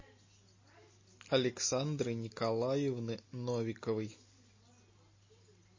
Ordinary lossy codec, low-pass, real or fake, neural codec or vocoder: MP3, 32 kbps; 7.2 kHz; real; none